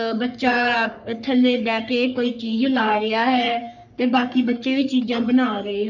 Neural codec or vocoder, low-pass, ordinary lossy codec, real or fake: codec, 44.1 kHz, 3.4 kbps, Pupu-Codec; 7.2 kHz; none; fake